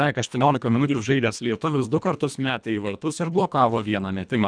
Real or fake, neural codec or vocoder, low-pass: fake; codec, 24 kHz, 1.5 kbps, HILCodec; 9.9 kHz